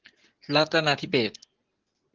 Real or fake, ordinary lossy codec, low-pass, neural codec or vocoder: real; Opus, 16 kbps; 7.2 kHz; none